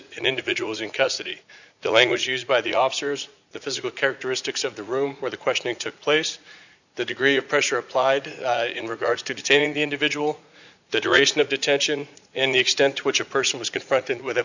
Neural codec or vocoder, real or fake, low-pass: vocoder, 44.1 kHz, 80 mel bands, Vocos; fake; 7.2 kHz